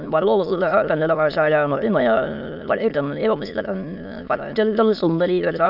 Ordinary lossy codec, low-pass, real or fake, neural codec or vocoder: none; 5.4 kHz; fake; autoencoder, 22.05 kHz, a latent of 192 numbers a frame, VITS, trained on many speakers